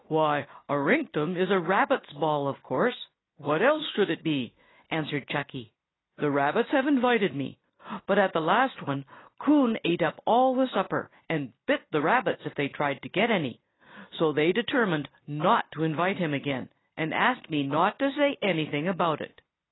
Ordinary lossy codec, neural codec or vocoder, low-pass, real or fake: AAC, 16 kbps; none; 7.2 kHz; real